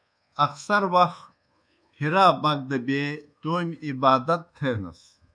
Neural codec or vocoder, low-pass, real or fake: codec, 24 kHz, 1.2 kbps, DualCodec; 9.9 kHz; fake